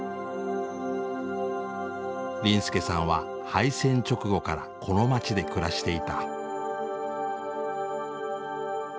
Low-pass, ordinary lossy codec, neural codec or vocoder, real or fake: none; none; none; real